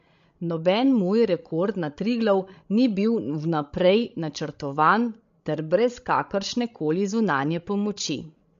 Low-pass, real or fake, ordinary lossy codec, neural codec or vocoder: 7.2 kHz; fake; MP3, 48 kbps; codec, 16 kHz, 16 kbps, FreqCodec, larger model